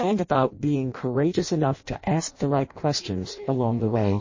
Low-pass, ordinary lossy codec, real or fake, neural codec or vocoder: 7.2 kHz; MP3, 32 kbps; fake; codec, 16 kHz in and 24 kHz out, 0.6 kbps, FireRedTTS-2 codec